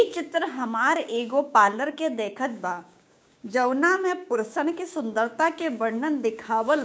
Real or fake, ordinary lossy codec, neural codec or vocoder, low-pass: fake; none; codec, 16 kHz, 6 kbps, DAC; none